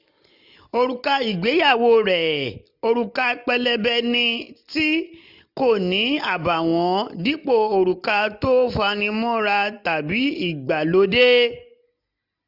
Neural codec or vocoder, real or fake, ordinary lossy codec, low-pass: none; real; none; 5.4 kHz